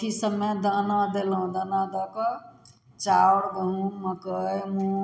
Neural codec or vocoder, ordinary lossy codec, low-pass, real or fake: none; none; none; real